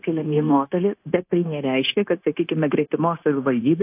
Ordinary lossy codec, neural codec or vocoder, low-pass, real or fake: AAC, 32 kbps; codec, 16 kHz, 0.9 kbps, LongCat-Audio-Codec; 3.6 kHz; fake